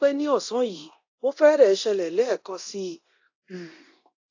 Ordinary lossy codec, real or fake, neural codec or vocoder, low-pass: AAC, 48 kbps; fake; codec, 24 kHz, 0.9 kbps, DualCodec; 7.2 kHz